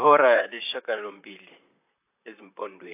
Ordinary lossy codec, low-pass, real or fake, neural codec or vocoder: AAC, 32 kbps; 3.6 kHz; fake; vocoder, 44.1 kHz, 128 mel bands, Pupu-Vocoder